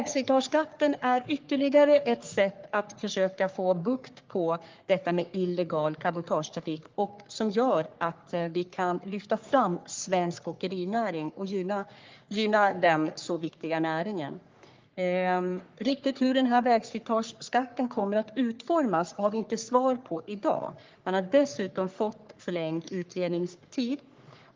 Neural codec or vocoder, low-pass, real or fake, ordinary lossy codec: codec, 44.1 kHz, 3.4 kbps, Pupu-Codec; 7.2 kHz; fake; Opus, 32 kbps